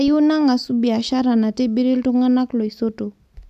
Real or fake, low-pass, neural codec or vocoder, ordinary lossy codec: real; 14.4 kHz; none; AAC, 96 kbps